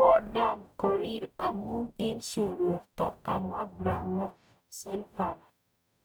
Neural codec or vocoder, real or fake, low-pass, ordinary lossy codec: codec, 44.1 kHz, 0.9 kbps, DAC; fake; none; none